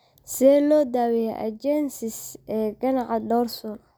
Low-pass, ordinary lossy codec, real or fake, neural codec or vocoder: none; none; real; none